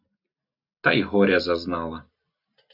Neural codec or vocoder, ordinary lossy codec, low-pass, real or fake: none; AAC, 48 kbps; 5.4 kHz; real